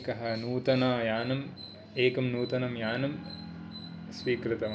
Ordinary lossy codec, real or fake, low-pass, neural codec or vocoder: none; real; none; none